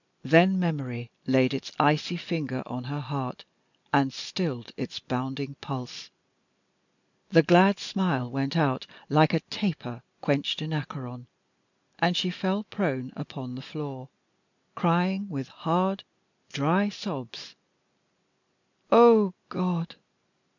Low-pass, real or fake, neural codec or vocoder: 7.2 kHz; real; none